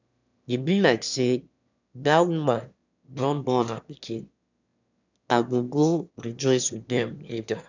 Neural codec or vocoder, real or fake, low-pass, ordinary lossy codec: autoencoder, 22.05 kHz, a latent of 192 numbers a frame, VITS, trained on one speaker; fake; 7.2 kHz; none